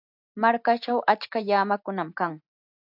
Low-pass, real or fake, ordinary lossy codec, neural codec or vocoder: 5.4 kHz; real; AAC, 48 kbps; none